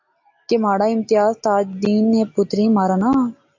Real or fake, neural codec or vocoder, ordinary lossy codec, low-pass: real; none; AAC, 48 kbps; 7.2 kHz